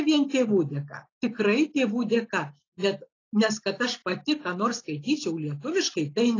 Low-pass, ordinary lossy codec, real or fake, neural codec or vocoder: 7.2 kHz; AAC, 32 kbps; real; none